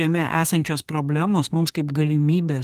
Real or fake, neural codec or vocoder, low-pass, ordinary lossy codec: fake; codec, 32 kHz, 1.9 kbps, SNAC; 14.4 kHz; Opus, 24 kbps